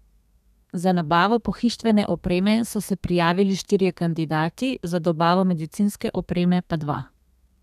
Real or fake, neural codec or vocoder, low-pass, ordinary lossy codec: fake; codec, 32 kHz, 1.9 kbps, SNAC; 14.4 kHz; none